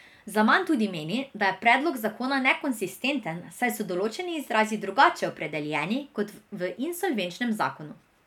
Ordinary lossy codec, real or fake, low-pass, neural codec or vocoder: none; real; 19.8 kHz; none